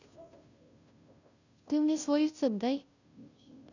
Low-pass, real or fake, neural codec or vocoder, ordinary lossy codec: 7.2 kHz; fake; codec, 16 kHz, 0.5 kbps, FunCodec, trained on Chinese and English, 25 frames a second; none